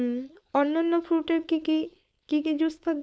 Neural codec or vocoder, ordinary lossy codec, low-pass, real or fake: codec, 16 kHz, 4.8 kbps, FACodec; none; none; fake